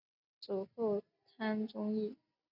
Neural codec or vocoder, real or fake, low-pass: none; real; 5.4 kHz